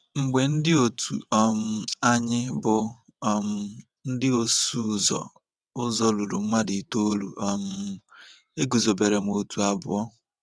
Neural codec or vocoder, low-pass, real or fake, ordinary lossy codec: vocoder, 22.05 kHz, 80 mel bands, WaveNeXt; none; fake; none